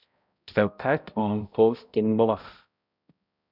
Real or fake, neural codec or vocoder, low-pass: fake; codec, 16 kHz, 0.5 kbps, X-Codec, HuBERT features, trained on balanced general audio; 5.4 kHz